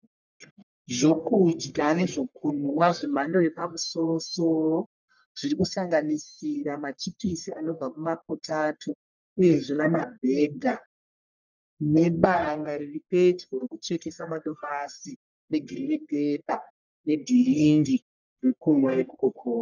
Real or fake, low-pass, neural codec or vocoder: fake; 7.2 kHz; codec, 44.1 kHz, 1.7 kbps, Pupu-Codec